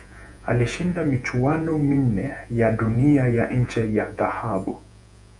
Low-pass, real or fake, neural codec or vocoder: 10.8 kHz; fake; vocoder, 48 kHz, 128 mel bands, Vocos